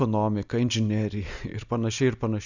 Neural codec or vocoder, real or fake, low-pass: none; real; 7.2 kHz